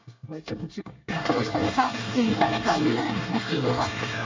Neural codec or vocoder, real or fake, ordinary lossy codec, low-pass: codec, 24 kHz, 1 kbps, SNAC; fake; MP3, 64 kbps; 7.2 kHz